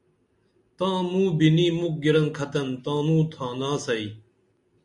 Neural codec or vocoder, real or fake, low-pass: none; real; 10.8 kHz